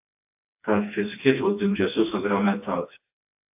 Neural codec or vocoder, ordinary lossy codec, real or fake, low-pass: codec, 16 kHz, 2 kbps, FreqCodec, smaller model; AAC, 32 kbps; fake; 3.6 kHz